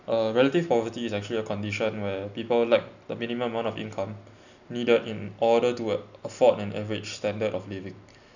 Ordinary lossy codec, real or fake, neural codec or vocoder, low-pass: none; real; none; 7.2 kHz